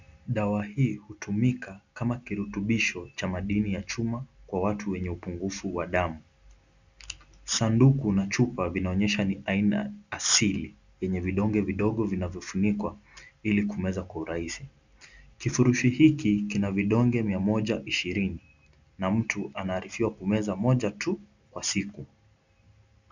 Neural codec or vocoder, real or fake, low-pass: none; real; 7.2 kHz